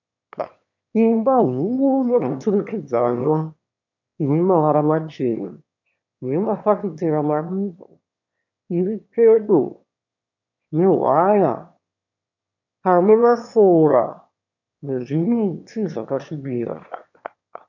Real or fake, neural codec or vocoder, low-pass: fake; autoencoder, 22.05 kHz, a latent of 192 numbers a frame, VITS, trained on one speaker; 7.2 kHz